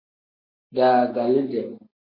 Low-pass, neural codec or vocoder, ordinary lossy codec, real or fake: 5.4 kHz; none; MP3, 32 kbps; real